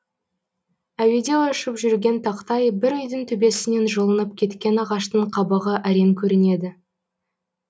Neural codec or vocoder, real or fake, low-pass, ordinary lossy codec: none; real; none; none